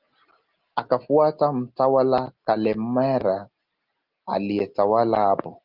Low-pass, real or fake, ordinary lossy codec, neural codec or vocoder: 5.4 kHz; real; Opus, 32 kbps; none